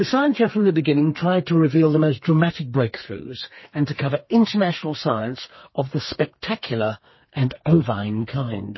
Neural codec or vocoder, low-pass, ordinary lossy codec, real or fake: codec, 32 kHz, 1.9 kbps, SNAC; 7.2 kHz; MP3, 24 kbps; fake